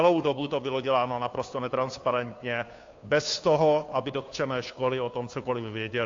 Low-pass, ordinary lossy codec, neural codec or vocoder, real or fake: 7.2 kHz; AAC, 48 kbps; codec, 16 kHz, 2 kbps, FunCodec, trained on Chinese and English, 25 frames a second; fake